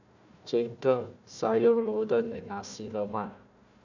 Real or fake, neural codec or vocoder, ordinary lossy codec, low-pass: fake; codec, 16 kHz, 1 kbps, FunCodec, trained on Chinese and English, 50 frames a second; none; 7.2 kHz